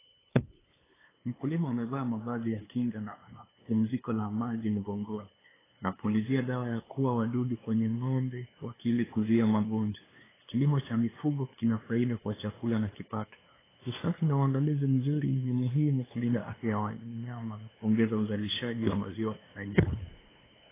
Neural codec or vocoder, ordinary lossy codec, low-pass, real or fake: codec, 16 kHz, 2 kbps, FunCodec, trained on LibriTTS, 25 frames a second; AAC, 16 kbps; 3.6 kHz; fake